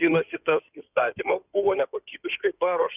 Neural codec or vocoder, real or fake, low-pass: codec, 16 kHz in and 24 kHz out, 2.2 kbps, FireRedTTS-2 codec; fake; 3.6 kHz